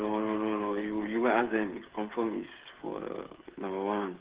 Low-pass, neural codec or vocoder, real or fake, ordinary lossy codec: 3.6 kHz; codec, 16 kHz, 8 kbps, FreqCodec, smaller model; fake; Opus, 16 kbps